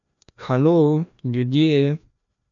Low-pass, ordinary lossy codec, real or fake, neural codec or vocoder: 7.2 kHz; none; fake; codec, 16 kHz, 1 kbps, FreqCodec, larger model